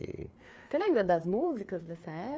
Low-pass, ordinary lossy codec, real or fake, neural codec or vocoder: none; none; fake; codec, 16 kHz, 4 kbps, FreqCodec, larger model